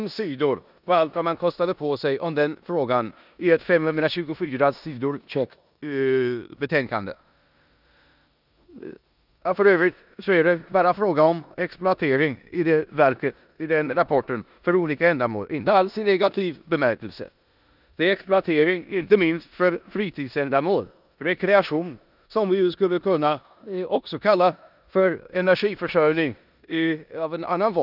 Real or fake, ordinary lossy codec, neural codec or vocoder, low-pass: fake; none; codec, 16 kHz in and 24 kHz out, 0.9 kbps, LongCat-Audio-Codec, four codebook decoder; 5.4 kHz